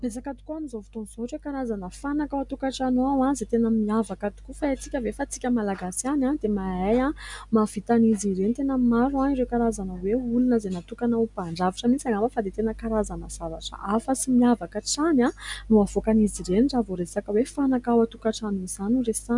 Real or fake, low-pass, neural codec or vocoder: real; 10.8 kHz; none